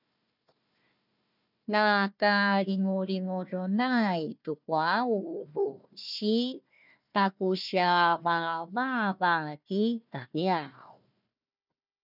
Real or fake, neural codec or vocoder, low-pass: fake; codec, 16 kHz, 1 kbps, FunCodec, trained on Chinese and English, 50 frames a second; 5.4 kHz